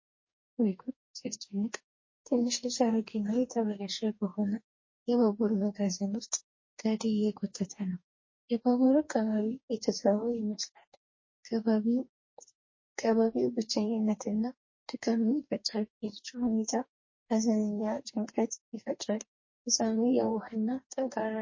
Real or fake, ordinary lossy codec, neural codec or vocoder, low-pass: fake; MP3, 32 kbps; codec, 44.1 kHz, 2.6 kbps, DAC; 7.2 kHz